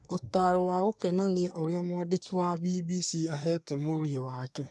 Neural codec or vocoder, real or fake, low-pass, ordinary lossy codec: codec, 24 kHz, 1 kbps, SNAC; fake; none; none